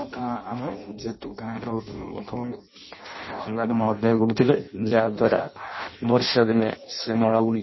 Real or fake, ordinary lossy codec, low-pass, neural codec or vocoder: fake; MP3, 24 kbps; 7.2 kHz; codec, 16 kHz in and 24 kHz out, 0.6 kbps, FireRedTTS-2 codec